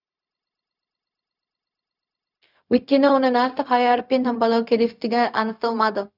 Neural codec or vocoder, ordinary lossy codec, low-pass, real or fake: codec, 16 kHz, 0.4 kbps, LongCat-Audio-Codec; none; 5.4 kHz; fake